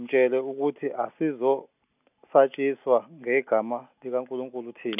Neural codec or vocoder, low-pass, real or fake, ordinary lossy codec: none; 3.6 kHz; real; none